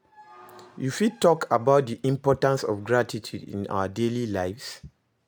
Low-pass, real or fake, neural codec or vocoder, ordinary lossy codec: none; real; none; none